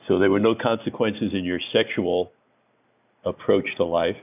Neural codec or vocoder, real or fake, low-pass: none; real; 3.6 kHz